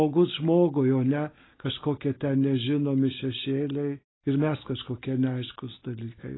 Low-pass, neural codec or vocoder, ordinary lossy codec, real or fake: 7.2 kHz; none; AAC, 16 kbps; real